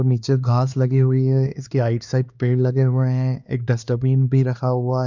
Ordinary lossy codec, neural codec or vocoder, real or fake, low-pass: none; codec, 16 kHz, 2 kbps, X-Codec, HuBERT features, trained on LibriSpeech; fake; 7.2 kHz